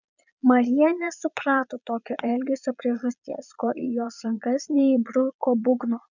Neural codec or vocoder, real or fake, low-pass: vocoder, 22.05 kHz, 80 mel bands, Vocos; fake; 7.2 kHz